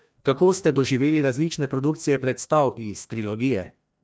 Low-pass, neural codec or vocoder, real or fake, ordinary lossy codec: none; codec, 16 kHz, 1 kbps, FreqCodec, larger model; fake; none